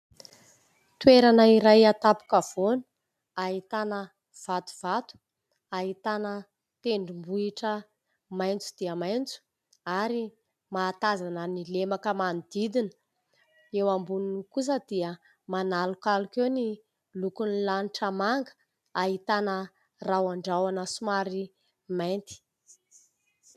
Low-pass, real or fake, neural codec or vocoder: 14.4 kHz; real; none